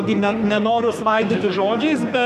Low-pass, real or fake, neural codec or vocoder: 14.4 kHz; fake; codec, 32 kHz, 1.9 kbps, SNAC